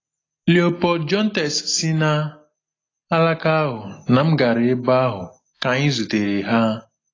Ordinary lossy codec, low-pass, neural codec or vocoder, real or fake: AAC, 32 kbps; 7.2 kHz; none; real